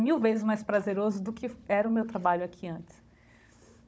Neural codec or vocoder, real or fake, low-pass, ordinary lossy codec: codec, 16 kHz, 16 kbps, FunCodec, trained on Chinese and English, 50 frames a second; fake; none; none